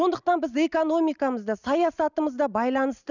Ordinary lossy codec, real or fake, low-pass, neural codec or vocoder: none; real; 7.2 kHz; none